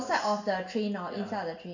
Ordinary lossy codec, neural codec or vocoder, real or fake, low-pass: none; none; real; 7.2 kHz